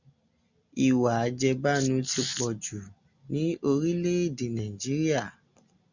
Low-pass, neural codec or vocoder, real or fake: 7.2 kHz; none; real